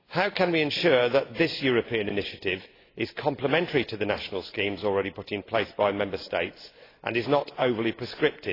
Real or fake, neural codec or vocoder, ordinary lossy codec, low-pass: real; none; AAC, 24 kbps; 5.4 kHz